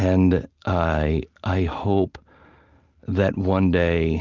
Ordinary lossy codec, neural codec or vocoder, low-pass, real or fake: Opus, 24 kbps; none; 7.2 kHz; real